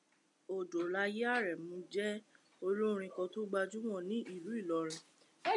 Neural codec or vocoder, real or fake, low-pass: none; real; 10.8 kHz